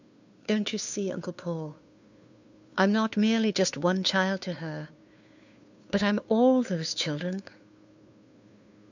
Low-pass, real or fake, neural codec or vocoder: 7.2 kHz; fake; codec, 16 kHz, 2 kbps, FunCodec, trained on Chinese and English, 25 frames a second